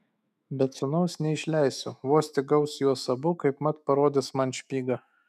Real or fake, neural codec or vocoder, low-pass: fake; autoencoder, 48 kHz, 128 numbers a frame, DAC-VAE, trained on Japanese speech; 14.4 kHz